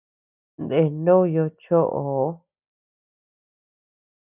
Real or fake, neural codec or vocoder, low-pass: real; none; 3.6 kHz